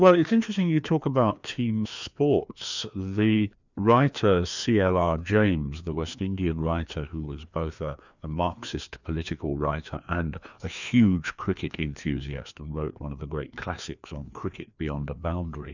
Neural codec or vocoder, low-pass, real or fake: codec, 16 kHz, 2 kbps, FreqCodec, larger model; 7.2 kHz; fake